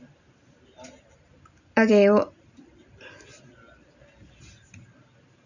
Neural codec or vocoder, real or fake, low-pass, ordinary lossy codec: none; real; 7.2 kHz; none